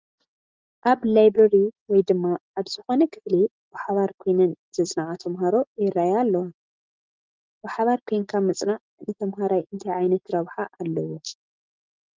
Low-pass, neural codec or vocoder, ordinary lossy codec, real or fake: 7.2 kHz; none; Opus, 32 kbps; real